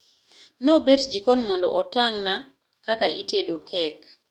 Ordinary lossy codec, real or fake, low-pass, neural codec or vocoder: none; fake; 19.8 kHz; codec, 44.1 kHz, 2.6 kbps, DAC